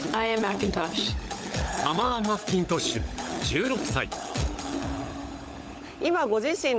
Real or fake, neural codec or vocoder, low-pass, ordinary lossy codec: fake; codec, 16 kHz, 16 kbps, FunCodec, trained on LibriTTS, 50 frames a second; none; none